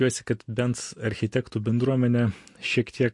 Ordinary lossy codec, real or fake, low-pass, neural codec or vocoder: MP3, 48 kbps; real; 10.8 kHz; none